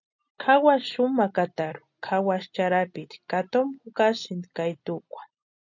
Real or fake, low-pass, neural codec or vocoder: real; 7.2 kHz; none